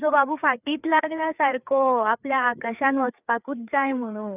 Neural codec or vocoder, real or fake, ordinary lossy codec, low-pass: codec, 16 kHz, 4 kbps, FreqCodec, larger model; fake; none; 3.6 kHz